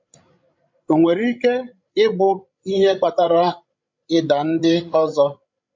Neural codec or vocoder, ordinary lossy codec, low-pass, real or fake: codec, 16 kHz, 16 kbps, FreqCodec, larger model; MP3, 48 kbps; 7.2 kHz; fake